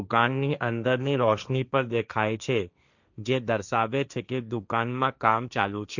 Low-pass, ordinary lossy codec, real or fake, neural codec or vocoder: 7.2 kHz; none; fake; codec, 16 kHz, 1.1 kbps, Voila-Tokenizer